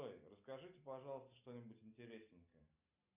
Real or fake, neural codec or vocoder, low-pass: real; none; 3.6 kHz